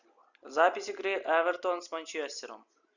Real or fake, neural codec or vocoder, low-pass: real; none; 7.2 kHz